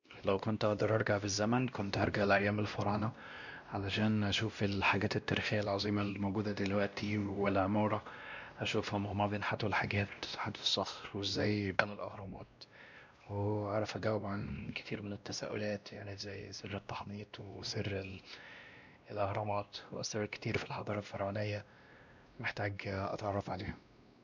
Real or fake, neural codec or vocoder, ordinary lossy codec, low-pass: fake; codec, 16 kHz, 1 kbps, X-Codec, WavLM features, trained on Multilingual LibriSpeech; none; 7.2 kHz